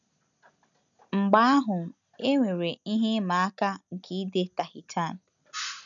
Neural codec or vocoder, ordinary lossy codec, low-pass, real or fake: none; none; 7.2 kHz; real